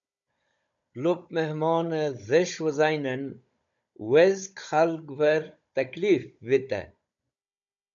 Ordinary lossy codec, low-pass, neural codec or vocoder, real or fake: MP3, 64 kbps; 7.2 kHz; codec, 16 kHz, 16 kbps, FunCodec, trained on Chinese and English, 50 frames a second; fake